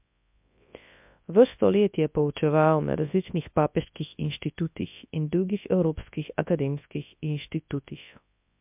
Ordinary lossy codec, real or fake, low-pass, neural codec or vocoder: MP3, 32 kbps; fake; 3.6 kHz; codec, 24 kHz, 0.9 kbps, WavTokenizer, large speech release